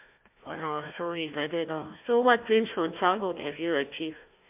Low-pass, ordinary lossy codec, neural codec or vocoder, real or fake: 3.6 kHz; MP3, 32 kbps; codec, 16 kHz, 1 kbps, FunCodec, trained on Chinese and English, 50 frames a second; fake